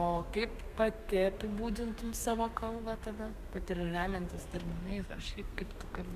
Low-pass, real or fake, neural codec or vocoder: 14.4 kHz; fake; codec, 32 kHz, 1.9 kbps, SNAC